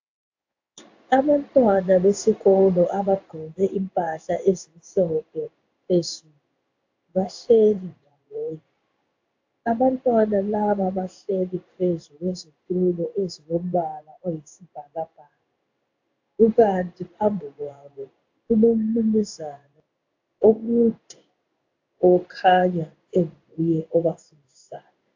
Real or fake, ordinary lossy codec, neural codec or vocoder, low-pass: fake; AAC, 48 kbps; codec, 16 kHz in and 24 kHz out, 1 kbps, XY-Tokenizer; 7.2 kHz